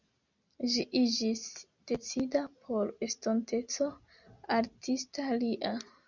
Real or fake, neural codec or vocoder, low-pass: real; none; 7.2 kHz